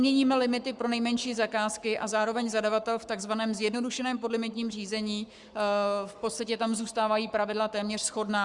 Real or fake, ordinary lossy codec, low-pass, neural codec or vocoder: fake; Opus, 64 kbps; 10.8 kHz; codec, 44.1 kHz, 7.8 kbps, Pupu-Codec